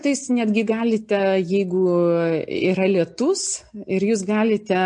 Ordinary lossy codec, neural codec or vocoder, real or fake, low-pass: MP3, 48 kbps; none; real; 9.9 kHz